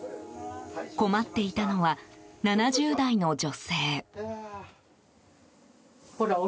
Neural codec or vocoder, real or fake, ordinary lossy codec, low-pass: none; real; none; none